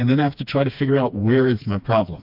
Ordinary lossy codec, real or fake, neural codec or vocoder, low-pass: MP3, 48 kbps; fake; codec, 16 kHz, 2 kbps, FreqCodec, smaller model; 5.4 kHz